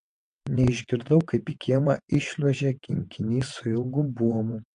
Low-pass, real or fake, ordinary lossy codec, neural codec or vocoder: 9.9 kHz; fake; AAC, 64 kbps; vocoder, 22.05 kHz, 80 mel bands, WaveNeXt